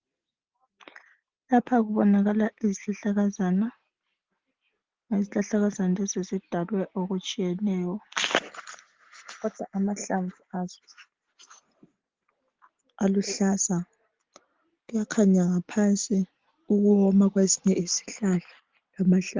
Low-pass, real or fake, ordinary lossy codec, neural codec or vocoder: 7.2 kHz; real; Opus, 16 kbps; none